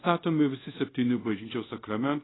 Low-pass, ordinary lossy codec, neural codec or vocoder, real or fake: 7.2 kHz; AAC, 16 kbps; codec, 24 kHz, 0.5 kbps, DualCodec; fake